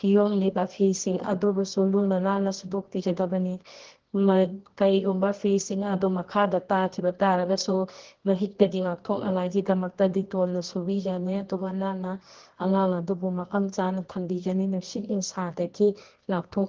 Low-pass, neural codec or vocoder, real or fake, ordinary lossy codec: 7.2 kHz; codec, 24 kHz, 0.9 kbps, WavTokenizer, medium music audio release; fake; Opus, 16 kbps